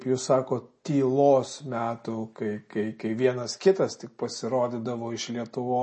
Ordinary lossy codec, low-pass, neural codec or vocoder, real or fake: MP3, 32 kbps; 10.8 kHz; none; real